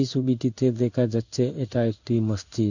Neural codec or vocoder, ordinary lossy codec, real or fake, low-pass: codec, 16 kHz in and 24 kHz out, 1 kbps, XY-Tokenizer; none; fake; 7.2 kHz